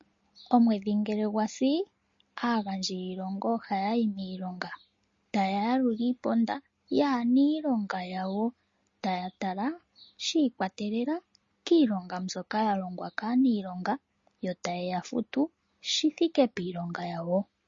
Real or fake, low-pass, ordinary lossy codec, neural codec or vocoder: real; 7.2 kHz; MP3, 32 kbps; none